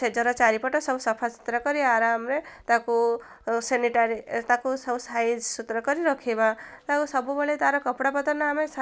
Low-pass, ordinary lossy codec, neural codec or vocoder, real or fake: none; none; none; real